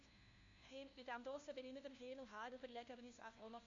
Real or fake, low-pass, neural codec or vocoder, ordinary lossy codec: fake; 7.2 kHz; codec, 16 kHz, 1 kbps, FunCodec, trained on LibriTTS, 50 frames a second; AAC, 48 kbps